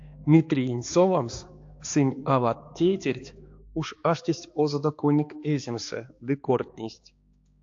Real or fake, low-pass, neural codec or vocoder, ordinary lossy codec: fake; 7.2 kHz; codec, 16 kHz, 2 kbps, X-Codec, HuBERT features, trained on balanced general audio; AAC, 48 kbps